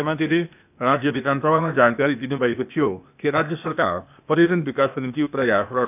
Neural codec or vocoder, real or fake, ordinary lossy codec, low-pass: codec, 16 kHz, 0.8 kbps, ZipCodec; fake; none; 3.6 kHz